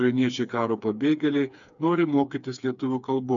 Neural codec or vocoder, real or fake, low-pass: codec, 16 kHz, 4 kbps, FreqCodec, smaller model; fake; 7.2 kHz